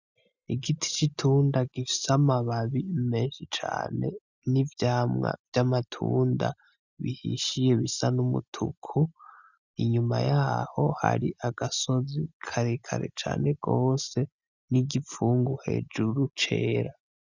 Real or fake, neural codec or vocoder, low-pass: real; none; 7.2 kHz